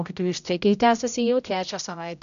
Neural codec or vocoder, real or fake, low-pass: codec, 16 kHz, 0.5 kbps, X-Codec, HuBERT features, trained on general audio; fake; 7.2 kHz